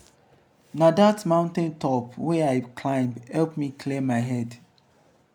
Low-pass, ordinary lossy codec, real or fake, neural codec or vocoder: 19.8 kHz; none; real; none